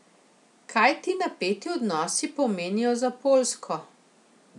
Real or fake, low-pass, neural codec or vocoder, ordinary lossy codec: real; 10.8 kHz; none; none